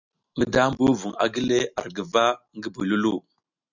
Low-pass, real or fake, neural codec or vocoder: 7.2 kHz; real; none